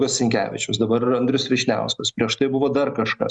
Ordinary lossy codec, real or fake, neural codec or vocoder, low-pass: Opus, 64 kbps; real; none; 10.8 kHz